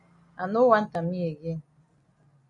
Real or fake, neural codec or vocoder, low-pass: real; none; 10.8 kHz